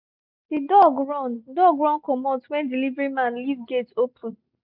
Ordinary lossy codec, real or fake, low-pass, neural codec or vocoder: none; real; 5.4 kHz; none